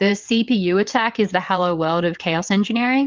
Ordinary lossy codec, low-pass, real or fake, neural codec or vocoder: Opus, 24 kbps; 7.2 kHz; fake; vocoder, 22.05 kHz, 80 mel bands, WaveNeXt